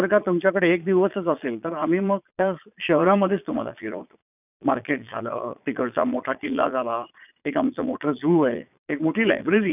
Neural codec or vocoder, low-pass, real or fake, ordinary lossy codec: vocoder, 22.05 kHz, 80 mel bands, Vocos; 3.6 kHz; fake; none